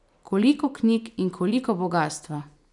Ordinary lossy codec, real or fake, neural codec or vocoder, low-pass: none; real; none; 10.8 kHz